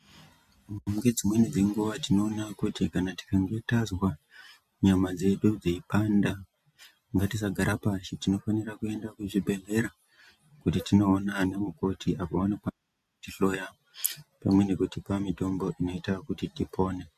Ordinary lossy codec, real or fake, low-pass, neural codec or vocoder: MP3, 64 kbps; real; 14.4 kHz; none